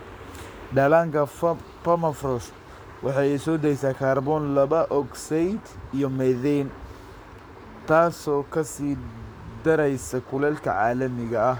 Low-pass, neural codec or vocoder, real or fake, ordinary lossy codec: none; codec, 44.1 kHz, 7.8 kbps, Pupu-Codec; fake; none